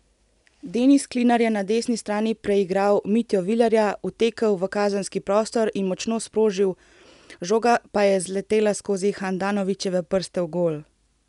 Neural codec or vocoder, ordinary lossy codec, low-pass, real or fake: none; none; 10.8 kHz; real